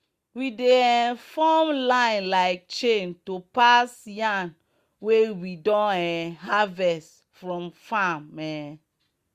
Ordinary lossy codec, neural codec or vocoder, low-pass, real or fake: Opus, 64 kbps; none; 14.4 kHz; real